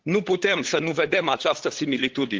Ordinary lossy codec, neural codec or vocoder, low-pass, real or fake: Opus, 16 kbps; codec, 16 kHz, 8 kbps, FunCodec, trained on LibriTTS, 25 frames a second; 7.2 kHz; fake